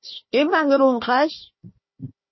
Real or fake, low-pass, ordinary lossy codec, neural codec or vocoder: fake; 7.2 kHz; MP3, 24 kbps; codec, 16 kHz, 1 kbps, FunCodec, trained on Chinese and English, 50 frames a second